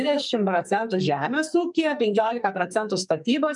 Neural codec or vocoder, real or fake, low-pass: codec, 44.1 kHz, 2.6 kbps, SNAC; fake; 10.8 kHz